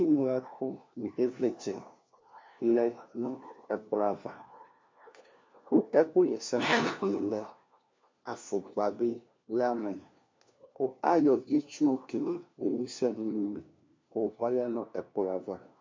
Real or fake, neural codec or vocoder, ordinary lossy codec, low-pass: fake; codec, 16 kHz, 1 kbps, FunCodec, trained on LibriTTS, 50 frames a second; MP3, 48 kbps; 7.2 kHz